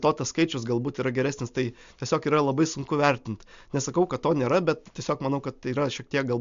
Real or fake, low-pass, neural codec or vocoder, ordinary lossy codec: real; 7.2 kHz; none; MP3, 64 kbps